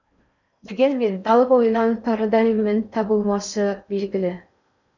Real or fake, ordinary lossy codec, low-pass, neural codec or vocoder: fake; none; 7.2 kHz; codec, 16 kHz in and 24 kHz out, 0.6 kbps, FocalCodec, streaming, 4096 codes